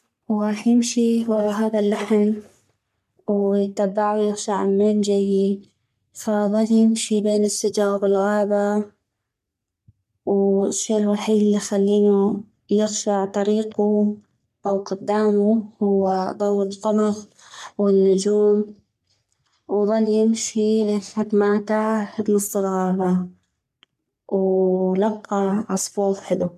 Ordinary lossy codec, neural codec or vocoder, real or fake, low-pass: MP3, 96 kbps; codec, 44.1 kHz, 3.4 kbps, Pupu-Codec; fake; 14.4 kHz